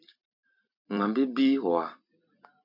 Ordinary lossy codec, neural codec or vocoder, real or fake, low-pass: MP3, 48 kbps; vocoder, 44.1 kHz, 128 mel bands every 256 samples, BigVGAN v2; fake; 5.4 kHz